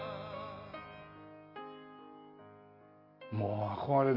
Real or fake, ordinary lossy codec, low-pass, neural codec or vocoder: real; Opus, 64 kbps; 5.4 kHz; none